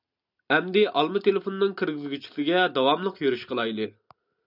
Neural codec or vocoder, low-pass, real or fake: none; 5.4 kHz; real